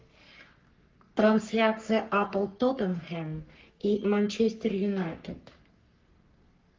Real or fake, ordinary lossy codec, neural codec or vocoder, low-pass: fake; Opus, 32 kbps; codec, 44.1 kHz, 3.4 kbps, Pupu-Codec; 7.2 kHz